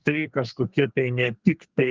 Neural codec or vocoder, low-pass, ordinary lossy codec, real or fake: codec, 32 kHz, 1.9 kbps, SNAC; 7.2 kHz; Opus, 32 kbps; fake